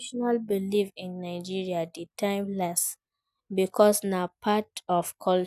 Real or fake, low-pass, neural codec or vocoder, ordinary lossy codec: real; 14.4 kHz; none; none